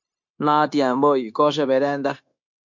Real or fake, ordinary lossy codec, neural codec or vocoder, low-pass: fake; MP3, 48 kbps; codec, 16 kHz, 0.9 kbps, LongCat-Audio-Codec; 7.2 kHz